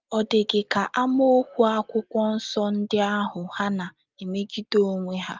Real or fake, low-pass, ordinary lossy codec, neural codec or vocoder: real; 7.2 kHz; Opus, 32 kbps; none